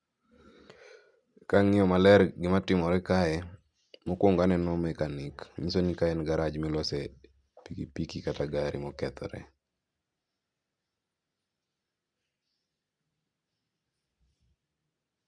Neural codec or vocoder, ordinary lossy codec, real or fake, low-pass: none; Opus, 64 kbps; real; 9.9 kHz